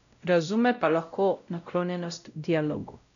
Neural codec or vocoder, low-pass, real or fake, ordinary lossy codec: codec, 16 kHz, 0.5 kbps, X-Codec, WavLM features, trained on Multilingual LibriSpeech; 7.2 kHz; fake; none